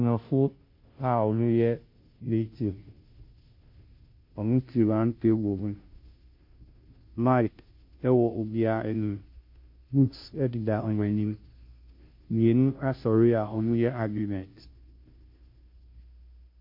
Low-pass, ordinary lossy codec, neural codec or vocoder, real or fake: 5.4 kHz; MP3, 32 kbps; codec, 16 kHz, 0.5 kbps, FunCodec, trained on Chinese and English, 25 frames a second; fake